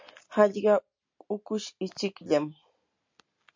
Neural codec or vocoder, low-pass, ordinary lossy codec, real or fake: none; 7.2 kHz; MP3, 64 kbps; real